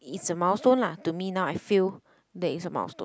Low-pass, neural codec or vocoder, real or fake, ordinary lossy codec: none; none; real; none